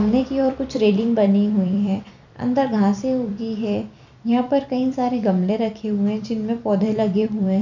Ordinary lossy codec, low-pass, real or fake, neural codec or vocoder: none; 7.2 kHz; fake; vocoder, 44.1 kHz, 128 mel bands every 256 samples, BigVGAN v2